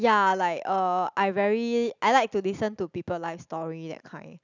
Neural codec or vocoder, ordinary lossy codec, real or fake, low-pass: none; none; real; 7.2 kHz